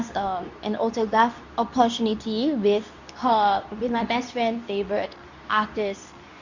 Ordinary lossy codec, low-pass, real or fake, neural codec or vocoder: none; 7.2 kHz; fake; codec, 24 kHz, 0.9 kbps, WavTokenizer, medium speech release version 2